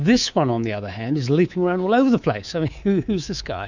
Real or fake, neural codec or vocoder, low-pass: fake; codec, 24 kHz, 3.1 kbps, DualCodec; 7.2 kHz